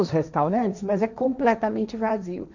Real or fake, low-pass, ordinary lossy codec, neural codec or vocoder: fake; 7.2 kHz; none; codec, 16 kHz, 1.1 kbps, Voila-Tokenizer